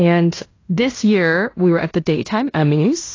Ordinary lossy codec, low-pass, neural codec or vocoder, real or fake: AAC, 32 kbps; 7.2 kHz; codec, 16 kHz in and 24 kHz out, 0.9 kbps, LongCat-Audio-Codec, fine tuned four codebook decoder; fake